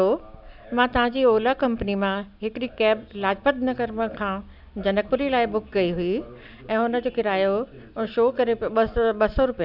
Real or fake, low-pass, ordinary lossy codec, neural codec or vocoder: real; 5.4 kHz; none; none